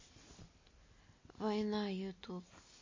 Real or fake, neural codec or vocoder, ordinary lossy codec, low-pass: real; none; MP3, 32 kbps; 7.2 kHz